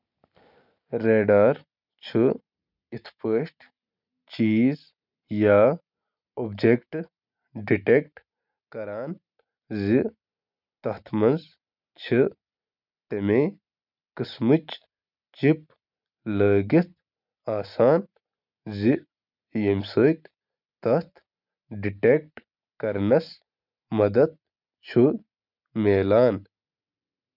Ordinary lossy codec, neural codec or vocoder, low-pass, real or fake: none; none; 5.4 kHz; real